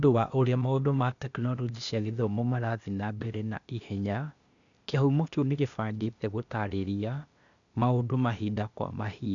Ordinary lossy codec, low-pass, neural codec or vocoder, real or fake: none; 7.2 kHz; codec, 16 kHz, 0.8 kbps, ZipCodec; fake